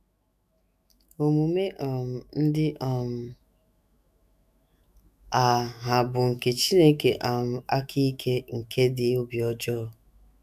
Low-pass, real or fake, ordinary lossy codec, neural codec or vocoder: 14.4 kHz; fake; none; autoencoder, 48 kHz, 128 numbers a frame, DAC-VAE, trained on Japanese speech